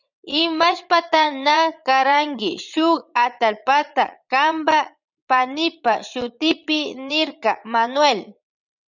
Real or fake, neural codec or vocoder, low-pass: fake; vocoder, 44.1 kHz, 80 mel bands, Vocos; 7.2 kHz